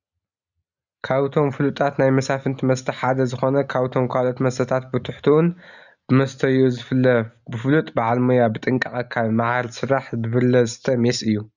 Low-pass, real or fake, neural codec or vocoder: 7.2 kHz; real; none